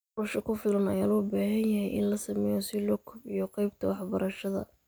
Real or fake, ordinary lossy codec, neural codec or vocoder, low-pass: real; none; none; none